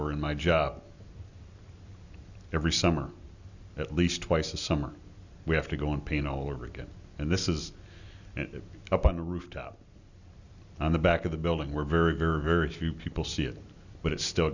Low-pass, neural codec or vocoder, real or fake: 7.2 kHz; none; real